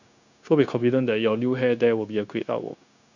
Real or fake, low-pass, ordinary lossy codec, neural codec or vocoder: fake; 7.2 kHz; none; codec, 16 kHz, 0.9 kbps, LongCat-Audio-Codec